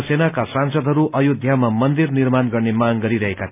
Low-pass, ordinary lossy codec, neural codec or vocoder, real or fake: 3.6 kHz; AAC, 32 kbps; none; real